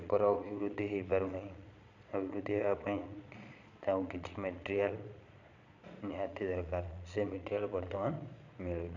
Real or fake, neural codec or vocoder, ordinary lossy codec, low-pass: fake; vocoder, 22.05 kHz, 80 mel bands, Vocos; none; 7.2 kHz